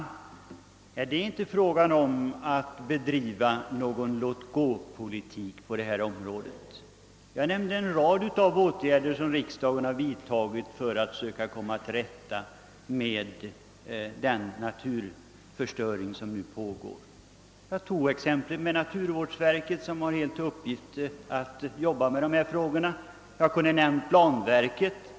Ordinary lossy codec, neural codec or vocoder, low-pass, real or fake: none; none; none; real